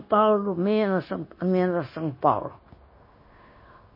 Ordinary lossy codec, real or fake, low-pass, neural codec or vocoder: MP3, 24 kbps; fake; 5.4 kHz; codec, 16 kHz, 0.9 kbps, LongCat-Audio-Codec